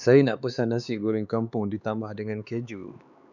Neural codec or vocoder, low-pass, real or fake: codec, 16 kHz, 4 kbps, X-Codec, HuBERT features, trained on LibriSpeech; 7.2 kHz; fake